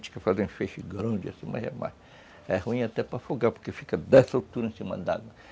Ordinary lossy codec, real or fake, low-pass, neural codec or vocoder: none; real; none; none